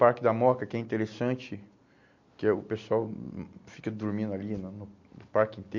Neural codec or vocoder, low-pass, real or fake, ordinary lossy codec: none; 7.2 kHz; real; MP3, 48 kbps